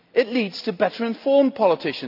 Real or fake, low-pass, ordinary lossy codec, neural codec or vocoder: real; 5.4 kHz; none; none